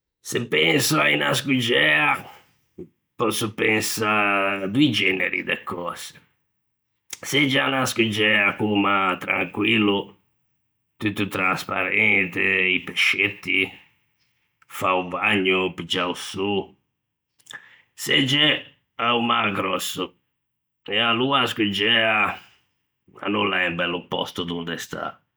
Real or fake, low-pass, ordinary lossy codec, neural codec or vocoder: real; none; none; none